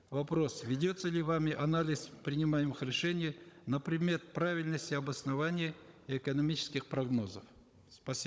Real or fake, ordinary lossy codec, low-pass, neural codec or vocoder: fake; none; none; codec, 16 kHz, 16 kbps, FunCodec, trained on Chinese and English, 50 frames a second